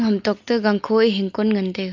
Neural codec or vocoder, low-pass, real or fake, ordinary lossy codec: none; 7.2 kHz; real; Opus, 24 kbps